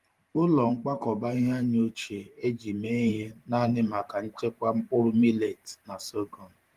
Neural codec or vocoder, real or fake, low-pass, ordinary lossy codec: vocoder, 44.1 kHz, 128 mel bands every 512 samples, BigVGAN v2; fake; 14.4 kHz; Opus, 24 kbps